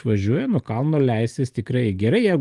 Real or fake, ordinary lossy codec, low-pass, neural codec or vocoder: fake; Opus, 24 kbps; 10.8 kHz; vocoder, 44.1 kHz, 128 mel bands every 512 samples, BigVGAN v2